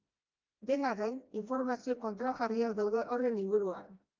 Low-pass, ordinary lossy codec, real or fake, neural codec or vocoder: 7.2 kHz; Opus, 24 kbps; fake; codec, 16 kHz, 1 kbps, FreqCodec, smaller model